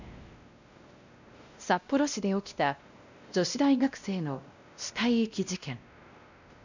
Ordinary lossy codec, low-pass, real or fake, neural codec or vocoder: none; 7.2 kHz; fake; codec, 16 kHz, 1 kbps, X-Codec, WavLM features, trained on Multilingual LibriSpeech